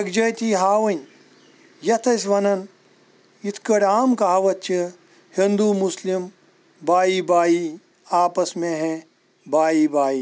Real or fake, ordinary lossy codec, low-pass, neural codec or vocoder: real; none; none; none